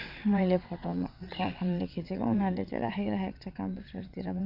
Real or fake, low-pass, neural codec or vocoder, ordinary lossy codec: fake; 5.4 kHz; vocoder, 22.05 kHz, 80 mel bands, WaveNeXt; none